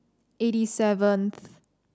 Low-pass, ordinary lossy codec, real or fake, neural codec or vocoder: none; none; real; none